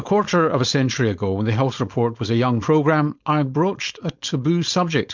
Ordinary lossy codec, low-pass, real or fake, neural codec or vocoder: MP3, 64 kbps; 7.2 kHz; fake; codec, 16 kHz, 4.8 kbps, FACodec